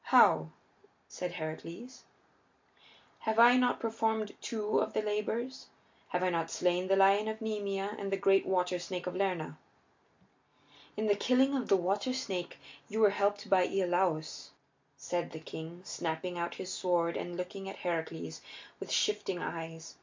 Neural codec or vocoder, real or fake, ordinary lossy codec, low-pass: none; real; MP3, 48 kbps; 7.2 kHz